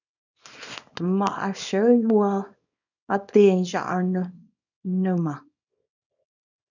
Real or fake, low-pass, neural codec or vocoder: fake; 7.2 kHz; codec, 24 kHz, 0.9 kbps, WavTokenizer, small release